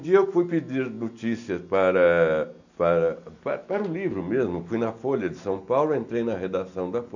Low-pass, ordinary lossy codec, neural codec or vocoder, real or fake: 7.2 kHz; none; none; real